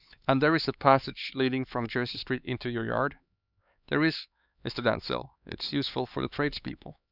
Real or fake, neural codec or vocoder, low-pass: fake; codec, 16 kHz, 4 kbps, X-Codec, HuBERT features, trained on LibriSpeech; 5.4 kHz